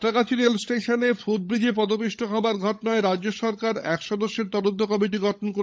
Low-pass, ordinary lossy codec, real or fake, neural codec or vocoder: none; none; fake; codec, 16 kHz, 16 kbps, FunCodec, trained on LibriTTS, 50 frames a second